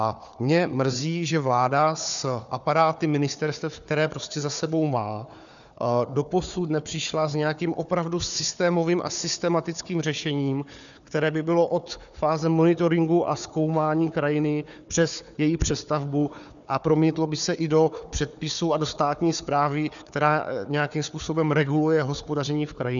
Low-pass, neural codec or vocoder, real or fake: 7.2 kHz; codec, 16 kHz, 4 kbps, FunCodec, trained on Chinese and English, 50 frames a second; fake